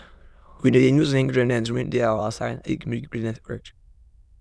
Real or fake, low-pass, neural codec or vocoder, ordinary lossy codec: fake; none; autoencoder, 22.05 kHz, a latent of 192 numbers a frame, VITS, trained on many speakers; none